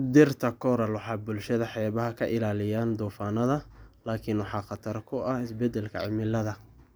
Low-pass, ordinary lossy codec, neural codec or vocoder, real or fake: none; none; none; real